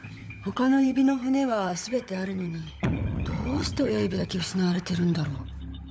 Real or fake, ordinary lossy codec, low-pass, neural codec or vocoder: fake; none; none; codec, 16 kHz, 16 kbps, FunCodec, trained on LibriTTS, 50 frames a second